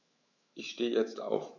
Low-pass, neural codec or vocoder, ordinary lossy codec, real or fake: 7.2 kHz; autoencoder, 48 kHz, 128 numbers a frame, DAC-VAE, trained on Japanese speech; none; fake